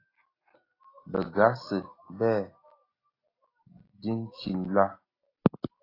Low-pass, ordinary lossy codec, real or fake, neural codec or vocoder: 5.4 kHz; AAC, 24 kbps; real; none